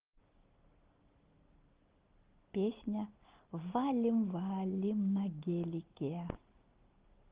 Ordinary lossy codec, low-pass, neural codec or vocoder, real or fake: Opus, 16 kbps; 3.6 kHz; none; real